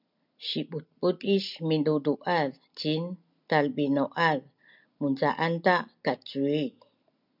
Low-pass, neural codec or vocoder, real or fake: 5.4 kHz; none; real